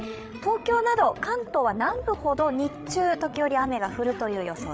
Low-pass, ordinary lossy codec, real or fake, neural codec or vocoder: none; none; fake; codec, 16 kHz, 16 kbps, FreqCodec, larger model